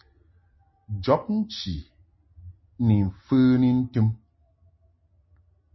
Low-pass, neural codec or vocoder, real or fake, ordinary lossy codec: 7.2 kHz; none; real; MP3, 24 kbps